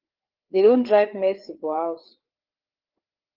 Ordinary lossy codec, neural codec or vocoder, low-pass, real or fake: Opus, 16 kbps; codec, 16 kHz, 4 kbps, FreqCodec, larger model; 5.4 kHz; fake